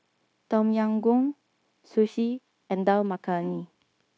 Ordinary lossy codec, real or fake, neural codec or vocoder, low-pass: none; fake; codec, 16 kHz, 0.9 kbps, LongCat-Audio-Codec; none